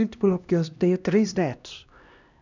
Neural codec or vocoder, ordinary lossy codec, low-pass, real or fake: codec, 16 kHz, 1 kbps, X-Codec, HuBERT features, trained on LibriSpeech; none; 7.2 kHz; fake